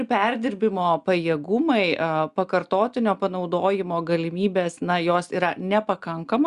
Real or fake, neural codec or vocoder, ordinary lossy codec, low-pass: real; none; Opus, 64 kbps; 10.8 kHz